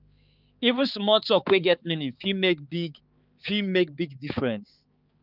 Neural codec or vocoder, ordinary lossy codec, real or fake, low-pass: codec, 16 kHz, 4 kbps, X-Codec, HuBERT features, trained on balanced general audio; Opus, 32 kbps; fake; 5.4 kHz